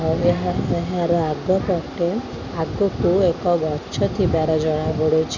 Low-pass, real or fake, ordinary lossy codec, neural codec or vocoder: 7.2 kHz; real; none; none